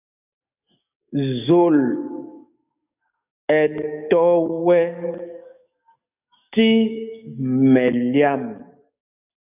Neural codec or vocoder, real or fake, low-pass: codec, 44.1 kHz, 7.8 kbps, DAC; fake; 3.6 kHz